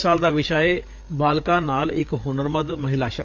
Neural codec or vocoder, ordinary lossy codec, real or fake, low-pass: codec, 16 kHz, 4 kbps, FreqCodec, larger model; AAC, 48 kbps; fake; 7.2 kHz